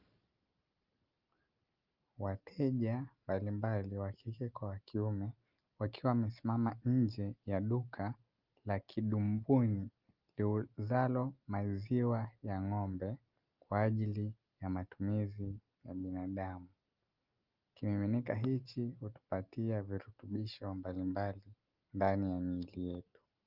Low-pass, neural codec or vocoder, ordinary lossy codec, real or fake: 5.4 kHz; none; Opus, 24 kbps; real